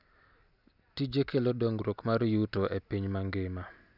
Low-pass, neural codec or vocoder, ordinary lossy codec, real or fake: 5.4 kHz; none; none; real